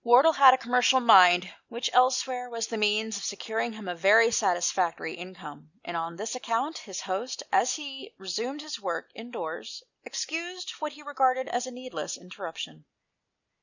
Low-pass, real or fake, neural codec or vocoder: 7.2 kHz; real; none